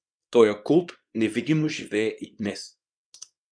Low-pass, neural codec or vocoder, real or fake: 9.9 kHz; codec, 24 kHz, 0.9 kbps, WavTokenizer, small release; fake